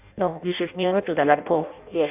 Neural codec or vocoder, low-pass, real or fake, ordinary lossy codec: codec, 16 kHz in and 24 kHz out, 0.6 kbps, FireRedTTS-2 codec; 3.6 kHz; fake; none